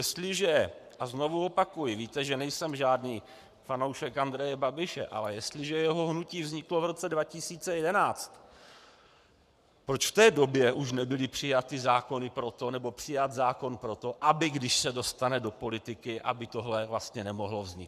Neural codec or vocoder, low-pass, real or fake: codec, 44.1 kHz, 7.8 kbps, Pupu-Codec; 14.4 kHz; fake